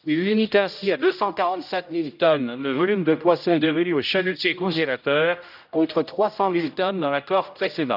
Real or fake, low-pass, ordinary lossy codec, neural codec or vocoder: fake; 5.4 kHz; none; codec, 16 kHz, 0.5 kbps, X-Codec, HuBERT features, trained on general audio